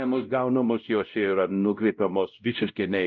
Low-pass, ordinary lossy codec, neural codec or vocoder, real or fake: 7.2 kHz; Opus, 24 kbps; codec, 16 kHz, 0.5 kbps, X-Codec, WavLM features, trained on Multilingual LibriSpeech; fake